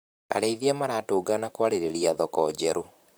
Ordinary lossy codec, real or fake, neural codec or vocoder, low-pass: none; fake; vocoder, 44.1 kHz, 128 mel bands, Pupu-Vocoder; none